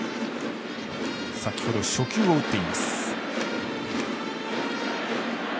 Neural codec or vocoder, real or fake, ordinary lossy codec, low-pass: none; real; none; none